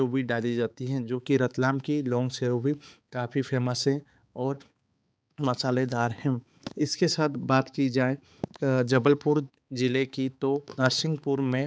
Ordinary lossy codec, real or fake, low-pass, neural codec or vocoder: none; fake; none; codec, 16 kHz, 4 kbps, X-Codec, HuBERT features, trained on balanced general audio